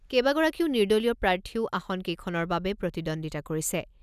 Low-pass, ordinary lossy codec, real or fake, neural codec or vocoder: 14.4 kHz; none; real; none